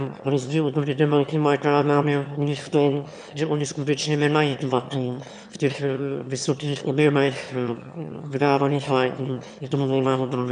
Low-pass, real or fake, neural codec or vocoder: 9.9 kHz; fake; autoencoder, 22.05 kHz, a latent of 192 numbers a frame, VITS, trained on one speaker